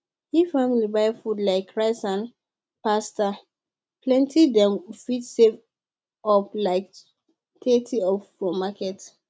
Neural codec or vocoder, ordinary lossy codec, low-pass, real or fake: none; none; none; real